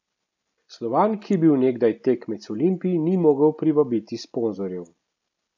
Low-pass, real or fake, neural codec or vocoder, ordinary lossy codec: 7.2 kHz; real; none; none